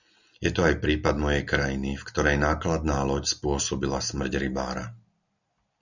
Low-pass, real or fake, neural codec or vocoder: 7.2 kHz; real; none